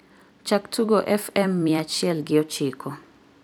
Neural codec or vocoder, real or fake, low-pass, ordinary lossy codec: vocoder, 44.1 kHz, 128 mel bands every 256 samples, BigVGAN v2; fake; none; none